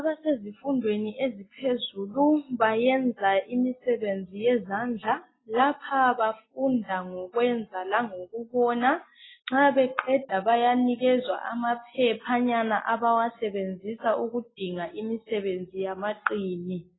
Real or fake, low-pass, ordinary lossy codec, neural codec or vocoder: real; 7.2 kHz; AAC, 16 kbps; none